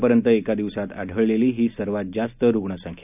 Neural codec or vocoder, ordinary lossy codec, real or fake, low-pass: none; none; real; 3.6 kHz